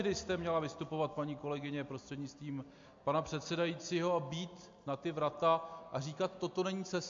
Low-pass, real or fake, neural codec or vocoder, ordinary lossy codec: 7.2 kHz; real; none; MP3, 48 kbps